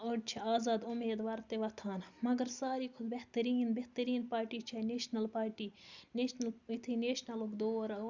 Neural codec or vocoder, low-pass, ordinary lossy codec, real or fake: none; 7.2 kHz; Opus, 64 kbps; real